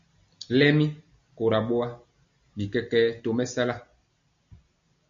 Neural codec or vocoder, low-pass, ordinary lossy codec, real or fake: none; 7.2 kHz; MP3, 48 kbps; real